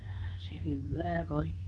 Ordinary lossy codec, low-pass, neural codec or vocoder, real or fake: none; 10.8 kHz; codec, 24 kHz, 0.9 kbps, WavTokenizer, medium speech release version 2; fake